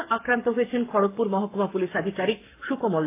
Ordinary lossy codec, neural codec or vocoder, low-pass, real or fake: AAC, 24 kbps; codec, 44.1 kHz, 7.8 kbps, Pupu-Codec; 3.6 kHz; fake